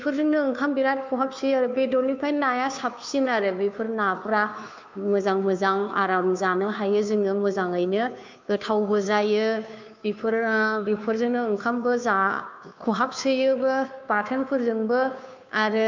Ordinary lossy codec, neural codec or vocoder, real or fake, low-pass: MP3, 64 kbps; codec, 16 kHz, 2 kbps, FunCodec, trained on Chinese and English, 25 frames a second; fake; 7.2 kHz